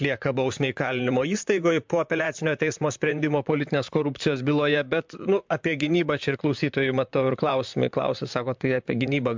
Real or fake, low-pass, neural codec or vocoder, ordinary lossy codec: fake; 7.2 kHz; vocoder, 44.1 kHz, 128 mel bands, Pupu-Vocoder; MP3, 64 kbps